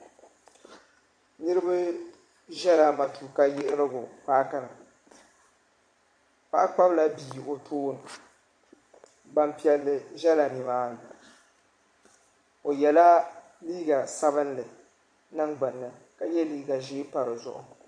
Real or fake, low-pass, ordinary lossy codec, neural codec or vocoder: fake; 9.9 kHz; MP3, 48 kbps; vocoder, 22.05 kHz, 80 mel bands, Vocos